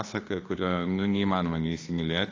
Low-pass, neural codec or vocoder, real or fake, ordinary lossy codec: 7.2 kHz; autoencoder, 48 kHz, 32 numbers a frame, DAC-VAE, trained on Japanese speech; fake; AAC, 32 kbps